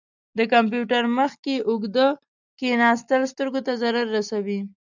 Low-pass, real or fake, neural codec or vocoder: 7.2 kHz; real; none